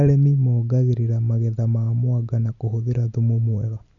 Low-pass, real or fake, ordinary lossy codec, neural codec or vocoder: 7.2 kHz; real; none; none